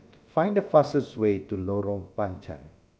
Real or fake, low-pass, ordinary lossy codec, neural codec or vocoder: fake; none; none; codec, 16 kHz, about 1 kbps, DyCAST, with the encoder's durations